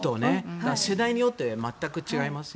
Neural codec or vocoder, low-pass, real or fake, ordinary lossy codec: none; none; real; none